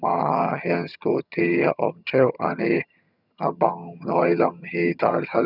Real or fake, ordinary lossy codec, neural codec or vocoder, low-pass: fake; none; vocoder, 22.05 kHz, 80 mel bands, HiFi-GAN; 5.4 kHz